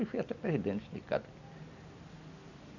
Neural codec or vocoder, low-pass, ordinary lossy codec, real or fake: none; 7.2 kHz; none; real